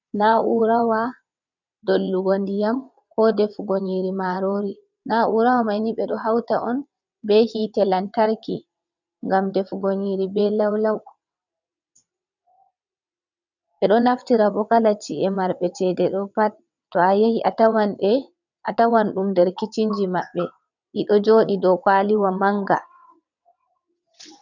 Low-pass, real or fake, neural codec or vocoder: 7.2 kHz; fake; vocoder, 22.05 kHz, 80 mel bands, WaveNeXt